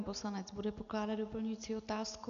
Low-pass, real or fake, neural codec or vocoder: 7.2 kHz; real; none